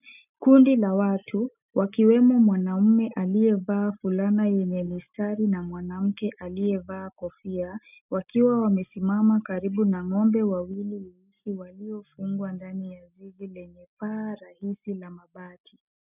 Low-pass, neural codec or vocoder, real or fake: 3.6 kHz; none; real